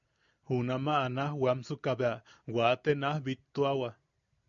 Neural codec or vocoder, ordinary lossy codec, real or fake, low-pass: none; MP3, 96 kbps; real; 7.2 kHz